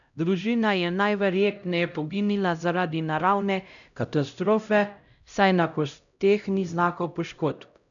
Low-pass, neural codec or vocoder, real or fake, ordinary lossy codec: 7.2 kHz; codec, 16 kHz, 0.5 kbps, X-Codec, HuBERT features, trained on LibriSpeech; fake; none